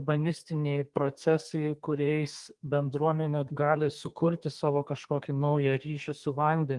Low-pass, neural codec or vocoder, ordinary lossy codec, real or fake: 10.8 kHz; codec, 32 kHz, 1.9 kbps, SNAC; Opus, 24 kbps; fake